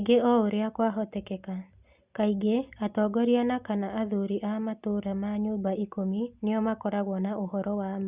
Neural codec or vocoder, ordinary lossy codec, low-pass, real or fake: none; Opus, 64 kbps; 3.6 kHz; real